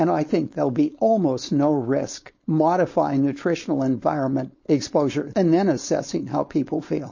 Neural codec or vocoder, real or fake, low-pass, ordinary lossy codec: codec, 16 kHz, 4.8 kbps, FACodec; fake; 7.2 kHz; MP3, 32 kbps